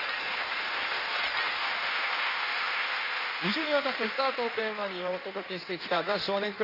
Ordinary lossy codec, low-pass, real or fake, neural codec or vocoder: Opus, 64 kbps; 5.4 kHz; fake; codec, 16 kHz in and 24 kHz out, 1.1 kbps, FireRedTTS-2 codec